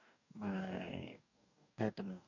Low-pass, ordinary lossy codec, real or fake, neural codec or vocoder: 7.2 kHz; none; fake; codec, 44.1 kHz, 2.6 kbps, DAC